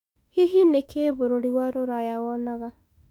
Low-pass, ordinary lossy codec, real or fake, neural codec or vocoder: 19.8 kHz; none; fake; autoencoder, 48 kHz, 32 numbers a frame, DAC-VAE, trained on Japanese speech